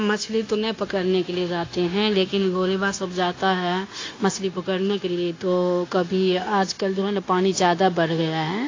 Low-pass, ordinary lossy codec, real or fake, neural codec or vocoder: 7.2 kHz; AAC, 32 kbps; fake; codec, 16 kHz, 0.9 kbps, LongCat-Audio-Codec